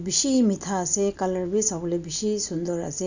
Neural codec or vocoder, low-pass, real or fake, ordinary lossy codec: none; 7.2 kHz; real; none